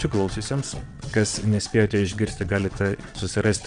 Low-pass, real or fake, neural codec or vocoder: 9.9 kHz; fake; vocoder, 22.05 kHz, 80 mel bands, WaveNeXt